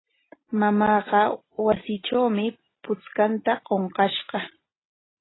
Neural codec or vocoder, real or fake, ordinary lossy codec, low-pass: none; real; AAC, 16 kbps; 7.2 kHz